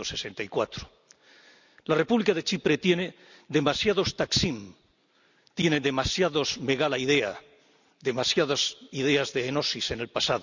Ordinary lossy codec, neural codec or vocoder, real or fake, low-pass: none; none; real; 7.2 kHz